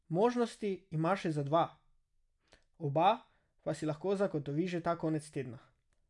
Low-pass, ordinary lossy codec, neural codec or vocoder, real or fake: 10.8 kHz; none; none; real